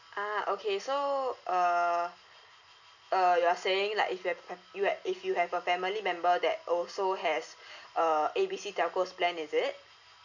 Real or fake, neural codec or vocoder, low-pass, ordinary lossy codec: real; none; 7.2 kHz; none